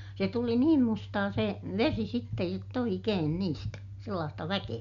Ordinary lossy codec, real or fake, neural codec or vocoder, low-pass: none; real; none; 7.2 kHz